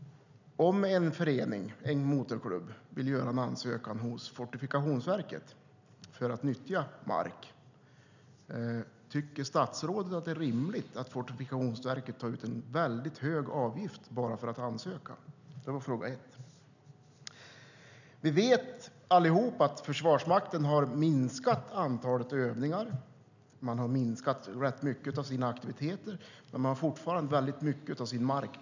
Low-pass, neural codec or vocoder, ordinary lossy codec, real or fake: 7.2 kHz; none; none; real